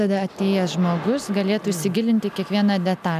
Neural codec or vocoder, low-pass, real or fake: none; 14.4 kHz; real